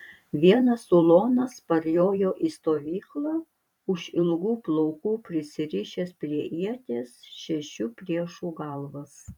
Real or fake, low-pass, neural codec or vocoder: fake; 19.8 kHz; vocoder, 44.1 kHz, 128 mel bands every 512 samples, BigVGAN v2